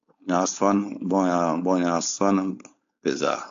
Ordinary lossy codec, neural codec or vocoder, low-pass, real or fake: AAC, 64 kbps; codec, 16 kHz, 4.8 kbps, FACodec; 7.2 kHz; fake